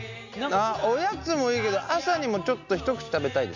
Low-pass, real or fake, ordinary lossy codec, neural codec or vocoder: 7.2 kHz; real; none; none